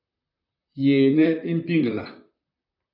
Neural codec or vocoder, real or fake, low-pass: vocoder, 44.1 kHz, 128 mel bands, Pupu-Vocoder; fake; 5.4 kHz